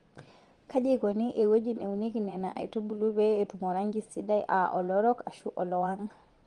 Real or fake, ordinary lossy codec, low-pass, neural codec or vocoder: fake; Opus, 24 kbps; 9.9 kHz; vocoder, 22.05 kHz, 80 mel bands, Vocos